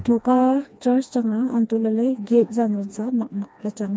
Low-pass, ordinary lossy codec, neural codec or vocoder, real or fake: none; none; codec, 16 kHz, 2 kbps, FreqCodec, smaller model; fake